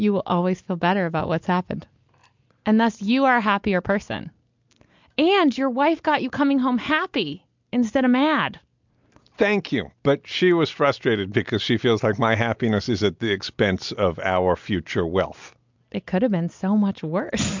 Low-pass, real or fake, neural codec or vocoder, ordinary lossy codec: 7.2 kHz; real; none; MP3, 64 kbps